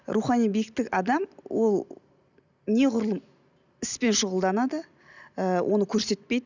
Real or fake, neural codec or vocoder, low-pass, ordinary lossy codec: real; none; 7.2 kHz; none